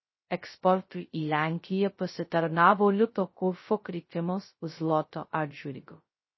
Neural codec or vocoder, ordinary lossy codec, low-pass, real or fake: codec, 16 kHz, 0.2 kbps, FocalCodec; MP3, 24 kbps; 7.2 kHz; fake